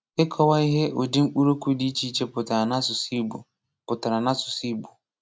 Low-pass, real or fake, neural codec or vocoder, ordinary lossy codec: none; real; none; none